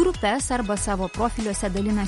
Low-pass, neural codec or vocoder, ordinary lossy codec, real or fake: 19.8 kHz; none; MP3, 48 kbps; real